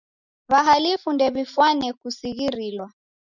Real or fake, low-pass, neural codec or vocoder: real; 7.2 kHz; none